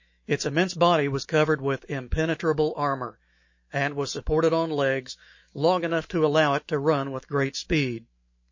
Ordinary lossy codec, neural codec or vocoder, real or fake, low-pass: MP3, 32 kbps; autoencoder, 48 kHz, 128 numbers a frame, DAC-VAE, trained on Japanese speech; fake; 7.2 kHz